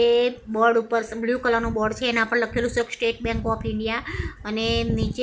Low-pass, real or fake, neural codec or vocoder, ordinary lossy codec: none; real; none; none